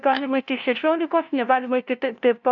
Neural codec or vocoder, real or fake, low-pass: codec, 16 kHz, 0.5 kbps, FunCodec, trained on LibriTTS, 25 frames a second; fake; 7.2 kHz